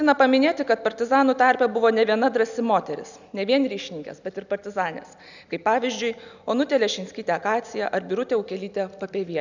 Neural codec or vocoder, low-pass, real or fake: none; 7.2 kHz; real